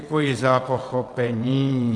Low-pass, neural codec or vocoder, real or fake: 9.9 kHz; vocoder, 22.05 kHz, 80 mel bands, WaveNeXt; fake